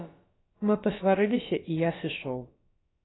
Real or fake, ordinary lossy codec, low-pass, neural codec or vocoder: fake; AAC, 16 kbps; 7.2 kHz; codec, 16 kHz, about 1 kbps, DyCAST, with the encoder's durations